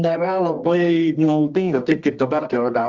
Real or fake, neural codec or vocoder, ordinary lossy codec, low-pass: fake; codec, 24 kHz, 0.9 kbps, WavTokenizer, medium music audio release; Opus, 32 kbps; 7.2 kHz